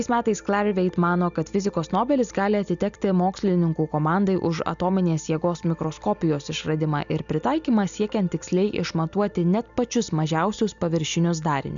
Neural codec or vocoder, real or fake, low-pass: none; real; 7.2 kHz